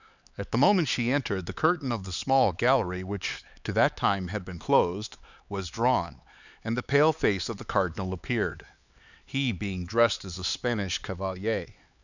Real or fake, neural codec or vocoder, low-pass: fake; codec, 16 kHz, 4 kbps, X-Codec, HuBERT features, trained on LibriSpeech; 7.2 kHz